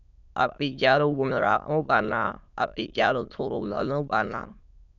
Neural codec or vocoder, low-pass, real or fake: autoencoder, 22.05 kHz, a latent of 192 numbers a frame, VITS, trained on many speakers; 7.2 kHz; fake